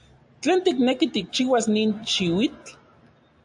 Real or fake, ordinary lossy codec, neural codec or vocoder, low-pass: real; AAC, 64 kbps; none; 10.8 kHz